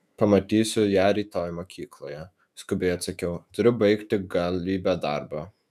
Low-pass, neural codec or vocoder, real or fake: 14.4 kHz; autoencoder, 48 kHz, 128 numbers a frame, DAC-VAE, trained on Japanese speech; fake